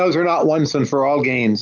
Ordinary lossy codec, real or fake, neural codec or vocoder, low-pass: Opus, 32 kbps; real; none; 7.2 kHz